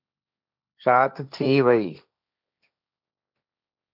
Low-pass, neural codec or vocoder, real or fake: 5.4 kHz; codec, 16 kHz, 1.1 kbps, Voila-Tokenizer; fake